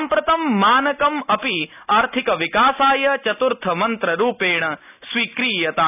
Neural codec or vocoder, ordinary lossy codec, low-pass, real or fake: none; none; 3.6 kHz; real